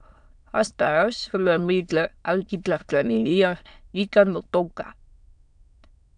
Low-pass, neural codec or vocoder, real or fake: 9.9 kHz; autoencoder, 22.05 kHz, a latent of 192 numbers a frame, VITS, trained on many speakers; fake